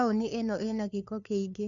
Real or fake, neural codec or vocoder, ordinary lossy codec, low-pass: fake; codec, 16 kHz, 2 kbps, FunCodec, trained on LibriTTS, 25 frames a second; MP3, 96 kbps; 7.2 kHz